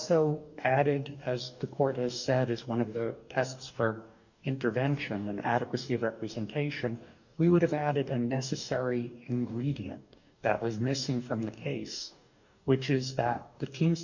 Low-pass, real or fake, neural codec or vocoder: 7.2 kHz; fake; codec, 44.1 kHz, 2.6 kbps, DAC